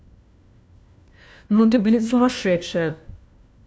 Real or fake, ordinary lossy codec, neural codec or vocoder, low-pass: fake; none; codec, 16 kHz, 1 kbps, FunCodec, trained on LibriTTS, 50 frames a second; none